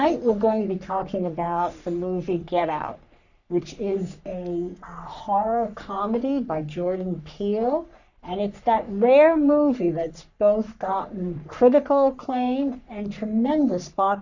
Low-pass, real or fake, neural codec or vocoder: 7.2 kHz; fake; codec, 44.1 kHz, 3.4 kbps, Pupu-Codec